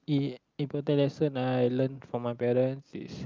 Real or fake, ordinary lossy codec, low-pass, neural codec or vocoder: real; Opus, 24 kbps; 7.2 kHz; none